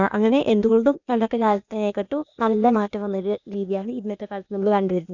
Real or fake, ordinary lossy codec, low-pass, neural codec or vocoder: fake; none; 7.2 kHz; codec, 16 kHz, 0.8 kbps, ZipCodec